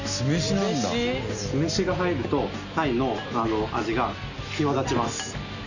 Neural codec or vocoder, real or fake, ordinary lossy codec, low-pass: none; real; none; 7.2 kHz